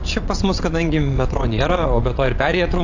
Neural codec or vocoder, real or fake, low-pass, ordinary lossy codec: vocoder, 22.05 kHz, 80 mel bands, WaveNeXt; fake; 7.2 kHz; AAC, 48 kbps